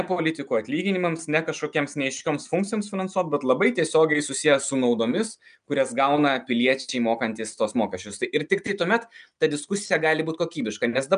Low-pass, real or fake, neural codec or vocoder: 9.9 kHz; real; none